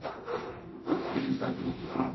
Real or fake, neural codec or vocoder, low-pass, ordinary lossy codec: fake; codec, 24 kHz, 0.9 kbps, DualCodec; 7.2 kHz; MP3, 24 kbps